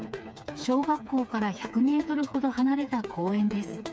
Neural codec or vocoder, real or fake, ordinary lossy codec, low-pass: codec, 16 kHz, 4 kbps, FreqCodec, smaller model; fake; none; none